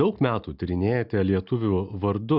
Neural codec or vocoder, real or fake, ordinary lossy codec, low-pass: none; real; Opus, 64 kbps; 5.4 kHz